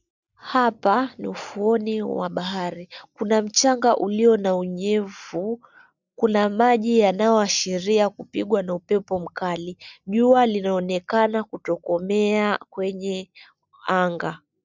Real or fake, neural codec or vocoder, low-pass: real; none; 7.2 kHz